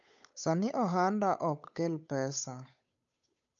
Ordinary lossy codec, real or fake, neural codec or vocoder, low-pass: MP3, 64 kbps; fake; codec, 16 kHz, 8 kbps, FunCodec, trained on Chinese and English, 25 frames a second; 7.2 kHz